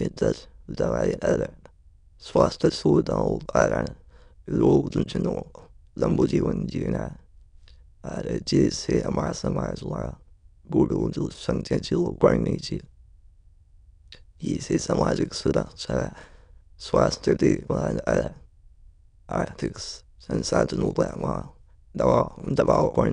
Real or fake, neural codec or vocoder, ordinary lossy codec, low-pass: fake; autoencoder, 22.05 kHz, a latent of 192 numbers a frame, VITS, trained on many speakers; none; 9.9 kHz